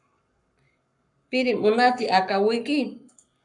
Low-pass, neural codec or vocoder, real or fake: 10.8 kHz; codec, 44.1 kHz, 7.8 kbps, Pupu-Codec; fake